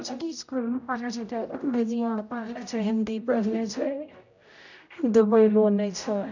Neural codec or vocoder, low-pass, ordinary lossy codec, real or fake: codec, 16 kHz, 0.5 kbps, X-Codec, HuBERT features, trained on general audio; 7.2 kHz; none; fake